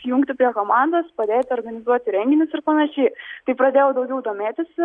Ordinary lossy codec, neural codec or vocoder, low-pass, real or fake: Opus, 64 kbps; none; 9.9 kHz; real